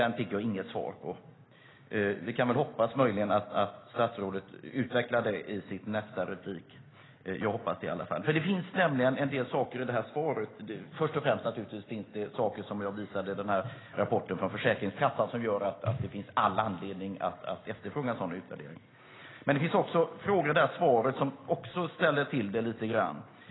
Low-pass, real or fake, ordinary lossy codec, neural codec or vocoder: 7.2 kHz; real; AAC, 16 kbps; none